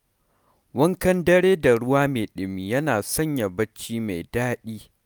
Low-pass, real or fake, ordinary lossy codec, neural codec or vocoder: none; real; none; none